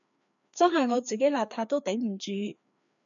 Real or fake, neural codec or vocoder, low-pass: fake; codec, 16 kHz, 2 kbps, FreqCodec, larger model; 7.2 kHz